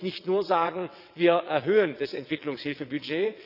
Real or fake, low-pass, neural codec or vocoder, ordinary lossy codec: fake; 5.4 kHz; vocoder, 22.05 kHz, 80 mel bands, WaveNeXt; none